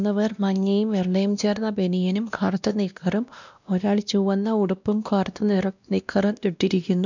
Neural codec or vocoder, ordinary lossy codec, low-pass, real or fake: codec, 16 kHz, 1 kbps, X-Codec, WavLM features, trained on Multilingual LibriSpeech; none; 7.2 kHz; fake